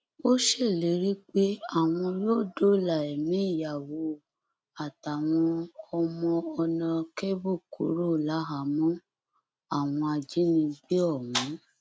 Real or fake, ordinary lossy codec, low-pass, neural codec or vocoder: real; none; none; none